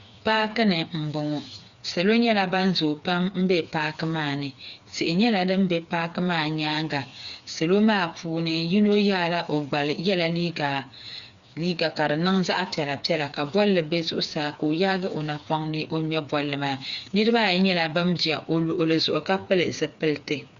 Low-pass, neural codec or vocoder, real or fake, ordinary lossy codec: 7.2 kHz; codec, 16 kHz, 4 kbps, FreqCodec, smaller model; fake; Opus, 64 kbps